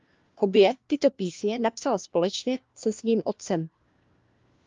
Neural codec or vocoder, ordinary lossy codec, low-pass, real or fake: codec, 16 kHz, 1 kbps, FunCodec, trained on LibriTTS, 50 frames a second; Opus, 32 kbps; 7.2 kHz; fake